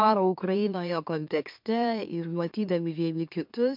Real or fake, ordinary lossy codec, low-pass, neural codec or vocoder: fake; MP3, 48 kbps; 5.4 kHz; autoencoder, 44.1 kHz, a latent of 192 numbers a frame, MeloTTS